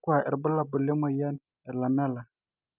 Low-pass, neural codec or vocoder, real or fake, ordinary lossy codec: 3.6 kHz; none; real; none